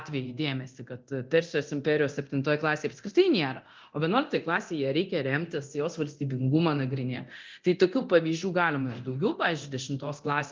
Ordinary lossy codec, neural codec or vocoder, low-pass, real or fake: Opus, 32 kbps; codec, 24 kHz, 0.9 kbps, DualCodec; 7.2 kHz; fake